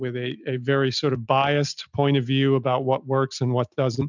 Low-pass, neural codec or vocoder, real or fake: 7.2 kHz; none; real